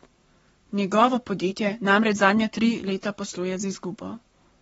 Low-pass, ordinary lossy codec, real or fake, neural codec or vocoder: 19.8 kHz; AAC, 24 kbps; fake; codec, 44.1 kHz, 7.8 kbps, Pupu-Codec